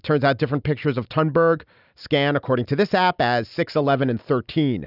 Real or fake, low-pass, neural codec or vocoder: real; 5.4 kHz; none